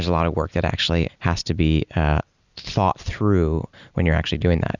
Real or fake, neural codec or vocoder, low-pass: real; none; 7.2 kHz